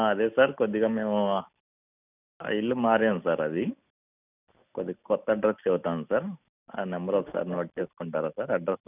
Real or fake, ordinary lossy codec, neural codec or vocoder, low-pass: real; none; none; 3.6 kHz